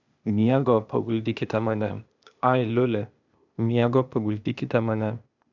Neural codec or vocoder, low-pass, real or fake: codec, 16 kHz, 0.8 kbps, ZipCodec; 7.2 kHz; fake